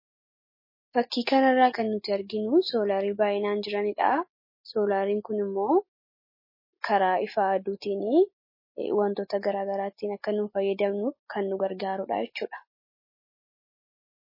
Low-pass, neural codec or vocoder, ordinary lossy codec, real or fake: 5.4 kHz; none; MP3, 24 kbps; real